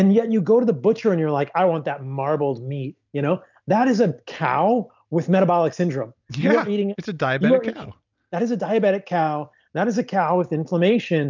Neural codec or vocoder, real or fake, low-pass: none; real; 7.2 kHz